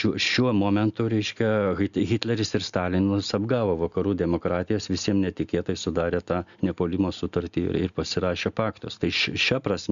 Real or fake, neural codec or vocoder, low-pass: real; none; 7.2 kHz